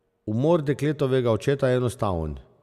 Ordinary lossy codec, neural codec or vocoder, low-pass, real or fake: MP3, 96 kbps; none; 14.4 kHz; real